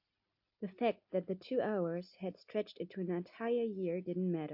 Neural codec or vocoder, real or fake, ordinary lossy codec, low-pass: none; real; AAC, 48 kbps; 5.4 kHz